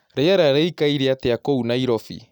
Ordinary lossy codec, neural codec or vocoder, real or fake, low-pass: none; none; real; 19.8 kHz